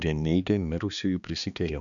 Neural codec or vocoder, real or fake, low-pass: codec, 16 kHz, 2 kbps, X-Codec, HuBERT features, trained on balanced general audio; fake; 7.2 kHz